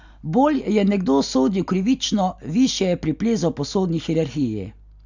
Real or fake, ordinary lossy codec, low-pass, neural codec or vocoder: real; none; 7.2 kHz; none